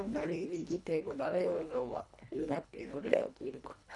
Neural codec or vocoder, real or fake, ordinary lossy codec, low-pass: codec, 24 kHz, 1.5 kbps, HILCodec; fake; none; 10.8 kHz